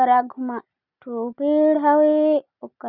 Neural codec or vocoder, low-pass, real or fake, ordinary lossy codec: none; 5.4 kHz; real; none